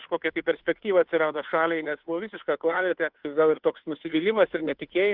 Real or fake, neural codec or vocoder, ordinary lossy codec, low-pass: fake; codec, 16 kHz, 2 kbps, FunCodec, trained on Chinese and English, 25 frames a second; Opus, 64 kbps; 5.4 kHz